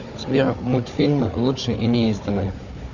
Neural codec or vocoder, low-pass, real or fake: codec, 16 kHz, 4 kbps, FunCodec, trained on Chinese and English, 50 frames a second; 7.2 kHz; fake